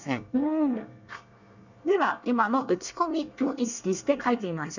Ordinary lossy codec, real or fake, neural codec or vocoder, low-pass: none; fake; codec, 24 kHz, 1 kbps, SNAC; 7.2 kHz